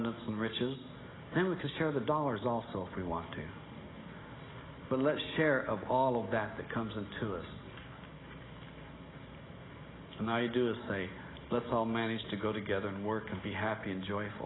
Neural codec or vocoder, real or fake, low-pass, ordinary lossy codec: none; real; 7.2 kHz; AAC, 16 kbps